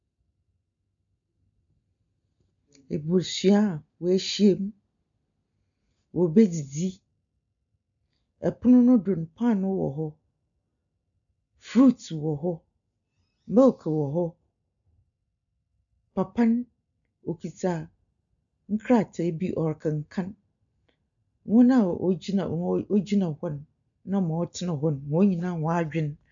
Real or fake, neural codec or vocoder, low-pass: real; none; 7.2 kHz